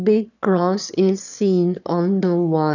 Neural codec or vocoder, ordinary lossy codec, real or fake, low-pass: autoencoder, 22.05 kHz, a latent of 192 numbers a frame, VITS, trained on one speaker; none; fake; 7.2 kHz